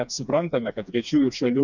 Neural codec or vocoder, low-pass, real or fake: codec, 16 kHz, 2 kbps, FreqCodec, smaller model; 7.2 kHz; fake